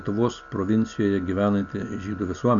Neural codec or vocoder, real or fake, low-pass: none; real; 7.2 kHz